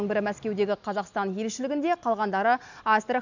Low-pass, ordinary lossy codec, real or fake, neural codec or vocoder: 7.2 kHz; none; real; none